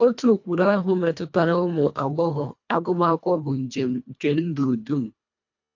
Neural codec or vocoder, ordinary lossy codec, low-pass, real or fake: codec, 24 kHz, 1.5 kbps, HILCodec; AAC, 48 kbps; 7.2 kHz; fake